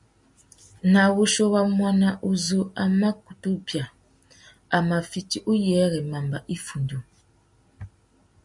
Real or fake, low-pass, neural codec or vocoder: real; 10.8 kHz; none